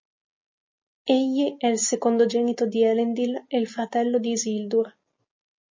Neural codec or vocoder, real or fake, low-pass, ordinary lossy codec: none; real; 7.2 kHz; MP3, 32 kbps